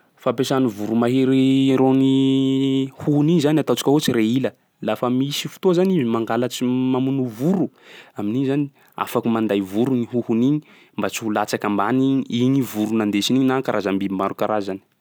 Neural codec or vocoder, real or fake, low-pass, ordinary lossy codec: none; real; none; none